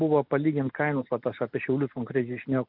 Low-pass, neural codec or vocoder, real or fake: 5.4 kHz; none; real